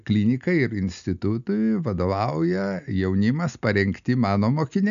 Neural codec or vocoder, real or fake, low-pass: none; real; 7.2 kHz